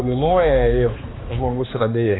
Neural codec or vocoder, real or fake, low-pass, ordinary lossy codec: codec, 16 kHz, 2 kbps, X-Codec, HuBERT features, trained on balanced general audio; fake; 7.2 kHz; AAC, 16 kbps